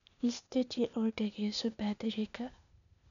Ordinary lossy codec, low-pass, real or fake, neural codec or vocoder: none; 7.2 kHz; fake; codec, 16 kHz, 0.8 kbps, ZipCodec